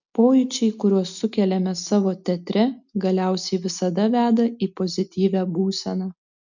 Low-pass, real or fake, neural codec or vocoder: 7.2 kHz; real; none